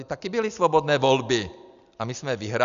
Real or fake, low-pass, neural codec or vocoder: real; 7.2 kHz; none